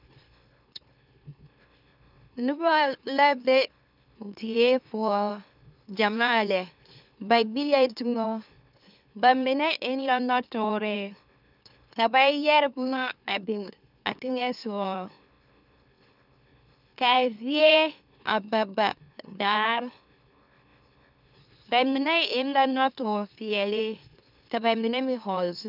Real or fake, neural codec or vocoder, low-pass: fake; autoencoder, 44.1 kHz, a latent of 192 numbers a frame, MeloTTS; 5.4 kHz